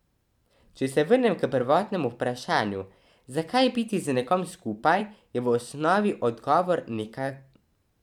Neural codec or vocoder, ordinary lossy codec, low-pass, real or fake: vocoder, 44.1 kHz, 128 mel bands every 512 samples, BigVGAN v2; none; 19.8 kHz; fake